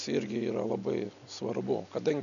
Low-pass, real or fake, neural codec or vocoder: 7.2 kHz; real; none